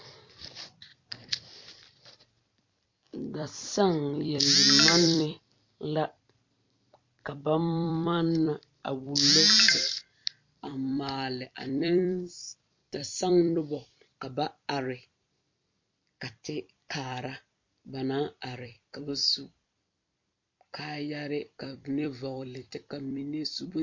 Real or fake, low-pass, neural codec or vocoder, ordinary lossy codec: fake; 7.2 kHz; vocoder, 44.1 kHz, 128 mel bands every 256 samples, BigVGAN v2; MP3, 48 kbps